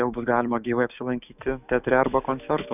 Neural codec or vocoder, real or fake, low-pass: autoencoder, 48 kHz, 128 numbers a frame, DAC-VAE, trained on Japanese speech; fake; 3.6 kHz